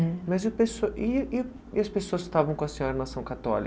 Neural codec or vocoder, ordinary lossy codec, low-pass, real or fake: none; none; none; real